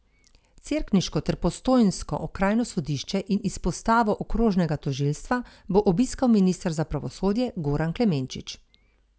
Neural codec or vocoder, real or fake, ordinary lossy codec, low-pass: none; real; none; none